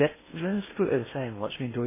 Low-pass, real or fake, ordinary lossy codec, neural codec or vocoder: 3.6 kHz; fake; MP3, 16 kbps; codec, 16 kHz in and 24 kHz out, 0.8 kbps, FocalCodec, streaming, 65536 codes